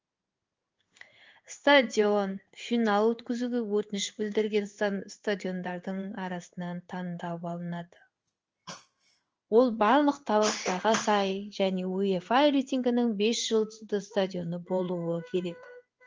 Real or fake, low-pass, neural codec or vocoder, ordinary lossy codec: fake; 7.2 kHz; codec, 16 kHz in and 24 kHz out, 1 kbps, XY-Tokenizer; Opus, 24 kbps